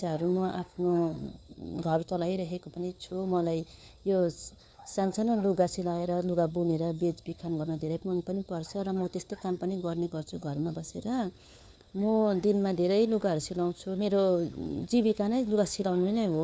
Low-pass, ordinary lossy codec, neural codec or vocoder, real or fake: none; none; codec, 16 kHz, 4 kbps, FunCodec, trained on LibriTTS, 50 frames a second; fake